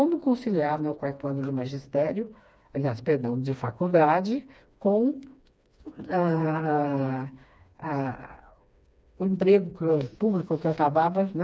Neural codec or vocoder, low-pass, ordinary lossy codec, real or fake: codec, 16 kHz, 2 kbps, FreqCodec, smaller model; none; none; fake